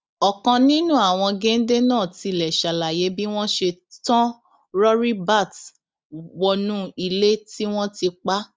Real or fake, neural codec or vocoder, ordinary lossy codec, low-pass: real; none; none; none